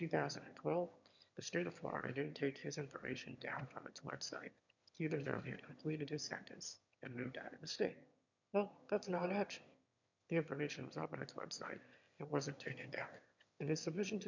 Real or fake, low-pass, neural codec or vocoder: fake; 7.2 kHz; autoencoder, 22.05 kHz, a latent of 192 numbers a frame, VITS, trained on one speaker